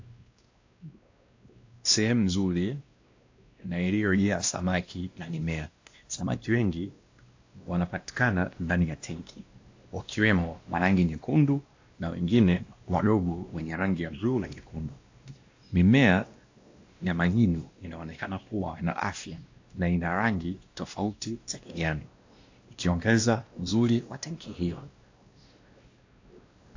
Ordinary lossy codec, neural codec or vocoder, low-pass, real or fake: AAC, 48 kbps; codec, 16 kHz, 1 kbps, X-Codec, WavLM features, trained on Multilingual LibriSpeech; 7.2 kHz; fake